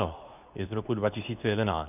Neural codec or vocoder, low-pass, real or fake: codec, 24 kHz, 0.9 kbps, WavTokenizer, medium speech release version 2; 3.6 kHz; fake